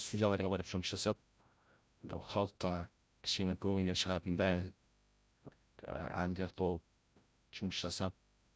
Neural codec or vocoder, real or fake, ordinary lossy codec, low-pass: codec, 16 kHz, 0.5 kbps, FreqCodec, larger model; fake; none; none